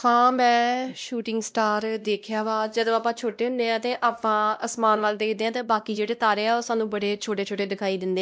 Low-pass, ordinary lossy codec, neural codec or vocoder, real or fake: none; none; codec, 16 kHz, 1 kbps, X-Codec, WavLM features, trained on Multilingual LibriSpeech; fake